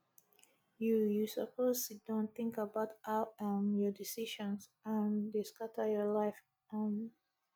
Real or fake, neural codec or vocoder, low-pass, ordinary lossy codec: real; none; none; none